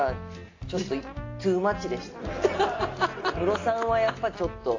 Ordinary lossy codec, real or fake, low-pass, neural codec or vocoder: AAC, 32 kbps; real; 7.2 kHz; none